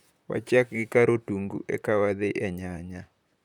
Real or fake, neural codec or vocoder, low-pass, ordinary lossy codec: fake; vocoder, 44.1 kHz, 128 mel bands, Pupu-Vocoder; 19.8 kHz; none